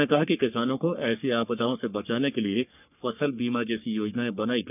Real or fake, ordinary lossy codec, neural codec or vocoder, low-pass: fake; none; codec, 44.1 kHz, 3.4 kbps, Pupu-Codec; 3.6 kHz